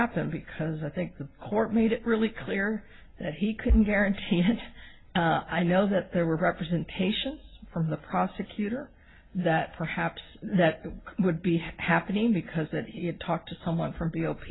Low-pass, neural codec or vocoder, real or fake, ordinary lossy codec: 7.2 kHz; none; real; AAC, 16 kbps